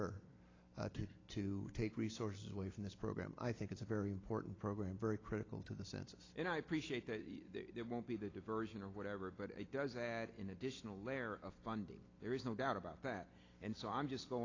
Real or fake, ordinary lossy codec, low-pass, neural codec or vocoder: real; AAC, 32 kbps; 7.2 kHz; none